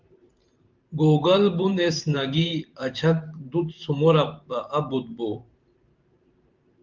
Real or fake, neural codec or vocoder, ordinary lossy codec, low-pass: fake; vocoder, 44.1 kHz, 128 mel bands every 512 samples, BigVGAN v2; Opus, 24 kbps; 7.2 kHz